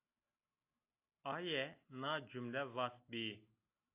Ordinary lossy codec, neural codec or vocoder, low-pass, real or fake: AAC, 24 kbps; none; 3.6 kHz; real